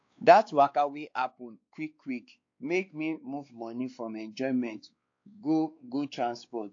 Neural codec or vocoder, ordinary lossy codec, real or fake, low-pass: codec, 16 kHz, 2 kbps, X-Codec, WavLM features, trained on Multilingual LibriSpeech; AAC, 48 kbps; fake; 7.2 kHz